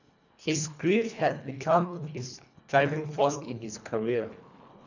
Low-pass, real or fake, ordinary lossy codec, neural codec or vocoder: 7.2 kHz; fake; none; codec, 24 kHz, 1.5 kbps, HILCodec